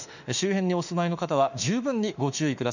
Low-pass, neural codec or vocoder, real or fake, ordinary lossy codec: 7.2 kHz; autoencoder, 48 kHz, 32 numbers a frame, DAC-VAE, trained on Japanese speech; fake; none